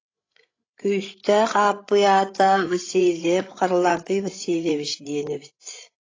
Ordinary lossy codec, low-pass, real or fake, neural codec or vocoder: AAC, 32 kbps; 7.2 kHz; fake; codec, 16 kHz, 16 kbps, FreqCodec, larger model